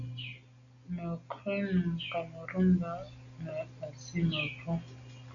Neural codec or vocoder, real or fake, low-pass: none; real; 7.2 kHz